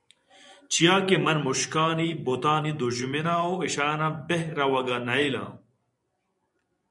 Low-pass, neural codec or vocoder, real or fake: 10.8 kHz; vocoder, 24 kHz, 100 mel bands, Vocos; fake